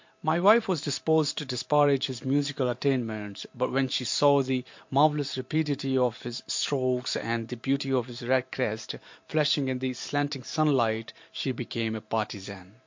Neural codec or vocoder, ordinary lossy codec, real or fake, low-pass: vocoder, 44.1 kHz, 128 mel bands every 512 samples, BigVGAN v2; MP3, 48 kbps; fake; 7.2 kHz